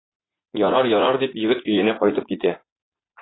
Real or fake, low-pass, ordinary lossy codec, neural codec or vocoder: fake; 7.2 kHz; AAC, 16 kbps; vocoder, 44.1 kHz, 80 mel bands, Vocos